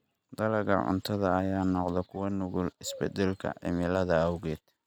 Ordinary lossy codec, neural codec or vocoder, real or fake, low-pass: none; none; real; 19.8 kHz